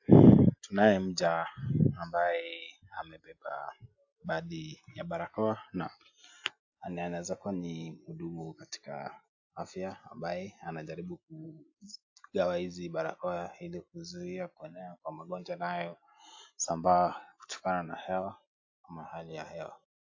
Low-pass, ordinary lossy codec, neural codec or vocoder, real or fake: 7.2 kHz; AAC, 48 kbps; none; real